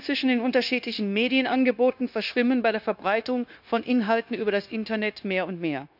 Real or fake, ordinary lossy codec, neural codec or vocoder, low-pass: fake; none; codec, 16 kHz, 0.9 kbps, LongCat-Audio-Codec; 5.4 kHz